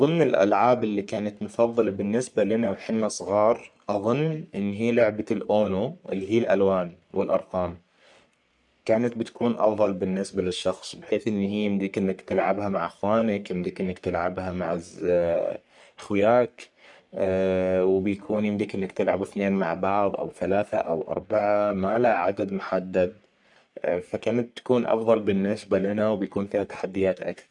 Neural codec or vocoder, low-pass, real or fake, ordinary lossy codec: codec, 44.1 kHz, 3.4 kbps, Pupu-Codec; 10.8 kHz; fake; none